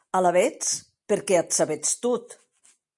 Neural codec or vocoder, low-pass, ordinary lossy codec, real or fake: none; 10.8 kHz; MP3, 48 kbps; real